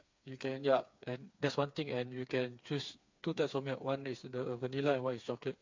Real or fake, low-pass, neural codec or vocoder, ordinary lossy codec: fake; 7.2 kHz; codec, 16 kHz, 4 kbps, FreqCodec, smaller model; MP3, 48 kbps